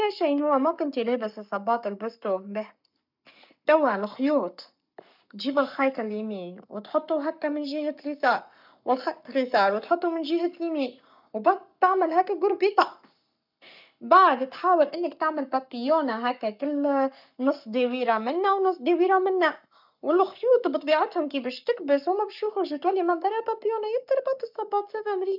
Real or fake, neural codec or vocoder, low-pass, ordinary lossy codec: fake; codec, 44.1 kHz, 7.8 kbps, Pupu-Codec; 5.4 kHz; none